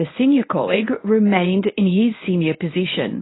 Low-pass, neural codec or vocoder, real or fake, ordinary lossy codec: 7.2 kHz; codec, 24 kHz, 0.9 kbps, WavTokenizer, medium speech release version 1; fake; AAC, 16 kbps